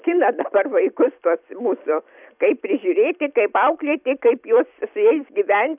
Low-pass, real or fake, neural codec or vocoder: 3.6 kHz; fake; vocoder, 44.1 kHz, 128 mel bands every 256 samples, BigVGAN v2